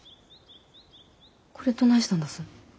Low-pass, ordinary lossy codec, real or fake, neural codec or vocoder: none; none; real; none